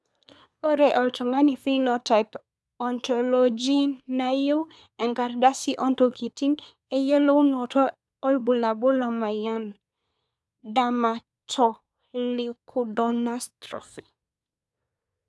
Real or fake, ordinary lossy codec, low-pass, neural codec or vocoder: fake; none; none; codec, 24 kHz, 1 kbps, SNAC